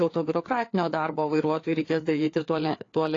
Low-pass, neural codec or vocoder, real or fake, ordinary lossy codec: 7.2 kHz; codec, 16 kHz, 4 kbps, FreqCodec, larger model; fake; AAC, 32 kbps